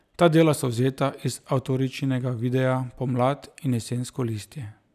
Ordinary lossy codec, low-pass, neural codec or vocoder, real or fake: none; 14.4 kHz; none; real